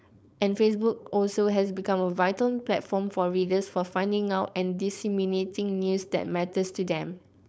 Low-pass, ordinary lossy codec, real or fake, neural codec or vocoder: none; none; fake; codec, 16 kHz, 4.8 kbps, FACodec